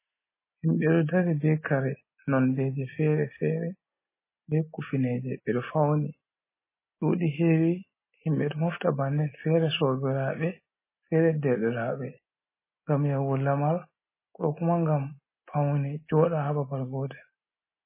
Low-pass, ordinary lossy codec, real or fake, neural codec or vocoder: 3.6 kHz; MP3, 16 kbps; real; none